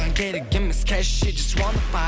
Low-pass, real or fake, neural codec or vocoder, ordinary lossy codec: none; real; none; none